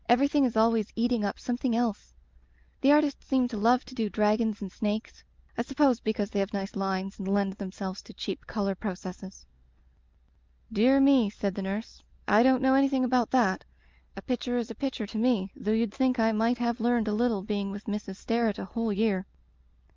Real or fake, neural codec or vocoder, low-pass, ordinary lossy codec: real; none; 7.2 kHz; Opus, 24 kbps